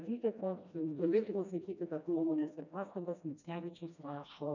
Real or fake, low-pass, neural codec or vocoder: fake; 7.2 kHz; codec, 16 kHz, 1 kbps, FreqCodec, smaller model